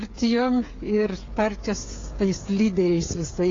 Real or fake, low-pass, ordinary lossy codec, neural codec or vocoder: fake; 7.2 kHz; AAC, 32 kbps; codec, 16 kHz, 8 kbps, FreqCodec, smaller model